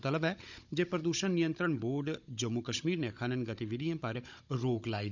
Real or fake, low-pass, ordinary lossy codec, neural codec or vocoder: fake; 7.2 kHz; none; codec, 16 kHz, 16 kbps, FunCodec, trained on Chinese and English, 50 frames a second